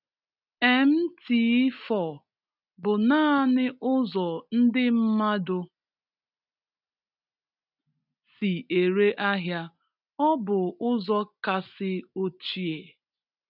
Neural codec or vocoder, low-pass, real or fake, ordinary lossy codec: none; 5.4 kHz; real; none